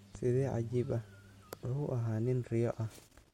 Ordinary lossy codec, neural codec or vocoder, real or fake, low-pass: MP3, 64 kbps; none; real; 19.8 kHz